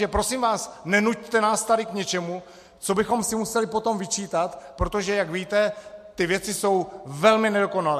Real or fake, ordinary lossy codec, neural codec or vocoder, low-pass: real; MP3, 64 kbps; none; 14.4 kHz